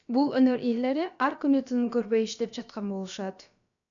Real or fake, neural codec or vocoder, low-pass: fake; codec, 16 kHz, about 1 kbps, DyCAST, with the encoder's durations; 7.2 kHz